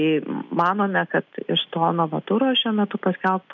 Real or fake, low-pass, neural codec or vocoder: real; 7.2 kHz; none